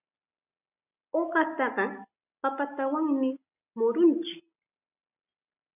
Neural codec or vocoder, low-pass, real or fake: none; 3.6 kHz; real